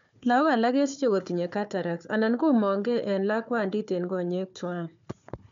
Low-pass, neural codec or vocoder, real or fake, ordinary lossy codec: 7.2 kHz; codec, 16 kHz, 4 kbps, FunCodec, trained on Chinese and English, 50 frames a second; fake; MP3, 64 kbps